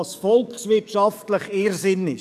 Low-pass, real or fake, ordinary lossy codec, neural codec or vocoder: 14.4 kHz; fake; none; codec, 44.1 kHz, 7.8 kbps, DAC